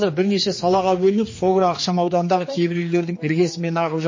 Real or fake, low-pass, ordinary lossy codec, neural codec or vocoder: fake; 7.2 kHz; MP3, 32 kbps; codec, 16 kHz, 2 kbps, X-Codec, HuBERT features, trained on general audio